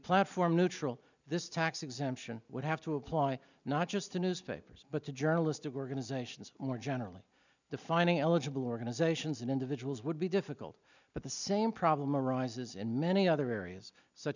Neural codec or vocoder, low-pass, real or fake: none; 7.2 kHz; real